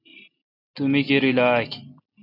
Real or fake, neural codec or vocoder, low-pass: real; none; 5.4 kHz